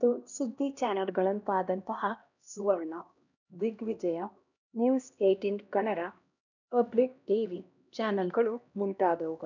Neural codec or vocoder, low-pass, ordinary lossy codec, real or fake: codec, 16 kHz, 1 kbps, X-Codec, HuBERT features, trained on LibriSpeech; 7.2 kHz; none; fake